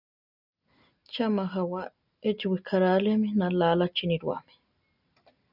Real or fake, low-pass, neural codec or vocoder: real; 5.4 kHz; none